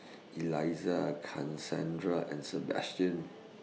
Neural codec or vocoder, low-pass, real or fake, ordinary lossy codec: none; none; real; none